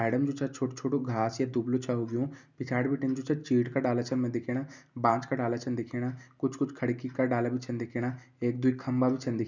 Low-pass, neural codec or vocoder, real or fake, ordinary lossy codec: 7.2 kHz; none; real; none